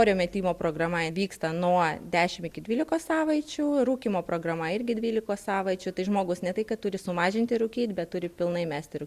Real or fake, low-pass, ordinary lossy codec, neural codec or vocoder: real; 14.4 kHz; Opus, 64 kbps; none